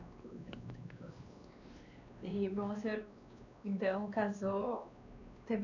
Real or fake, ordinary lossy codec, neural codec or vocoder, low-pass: fake; none; codec, 16 kHz, 2 kbps, X-Codec, WavLM features, trained on Multilingual LibriSpeech; 7.2 kHz